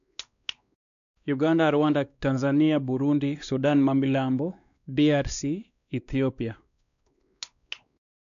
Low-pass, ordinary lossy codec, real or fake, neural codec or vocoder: 7.2 kHz; none; fake; codec, 16 kHz, 2 kbps, X-Codec, WavLM features, trained on Multilingual LibriSpeech